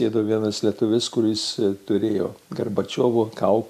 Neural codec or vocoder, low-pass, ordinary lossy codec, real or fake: none; 14.4 kHz; MP3, 96 kbps; real